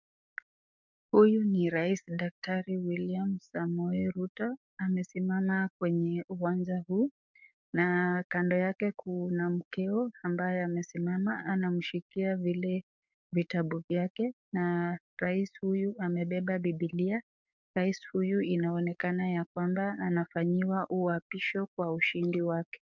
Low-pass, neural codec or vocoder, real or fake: 7.2 kHz; codec, 44.1 kHz, 7.8 kbps, DAC; fake